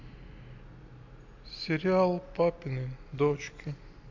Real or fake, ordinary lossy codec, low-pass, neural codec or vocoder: real; AAC, 48 kbps; 7.2 kHz; none